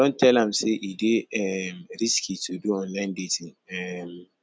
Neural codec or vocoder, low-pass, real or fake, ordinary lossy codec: none; none; real; none